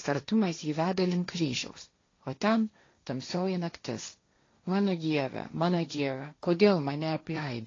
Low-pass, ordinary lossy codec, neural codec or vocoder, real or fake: 7.2 kHz; AAC, 32 kbps; codec, 16 kHz, 1.1 kbps, Voila-Tokenizer; fake